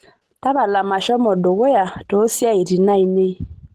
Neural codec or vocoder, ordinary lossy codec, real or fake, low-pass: none; Opus, 16 kbps; real; 14.4 kHz